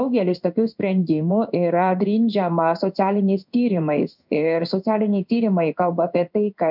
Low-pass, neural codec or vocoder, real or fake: 5.4 kHz; codec, 16 kHz in and 24 kHz out, 1 kbps, XY-Tokenizer; fake